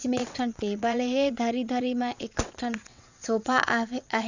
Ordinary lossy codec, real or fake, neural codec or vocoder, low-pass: none; fake; vocoder, 22.05 kHz, 80 mel bands, WaveNeXt; 7.2 kHz